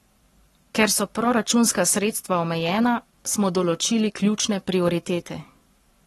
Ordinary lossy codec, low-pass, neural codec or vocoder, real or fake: AAC, 32 kbps; 19.8 kHz; codec, 44.1 kHz, 7.8 kbps, Pupu-Codec; fake